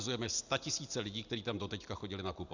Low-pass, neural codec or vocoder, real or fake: 7.2 kHz; none; real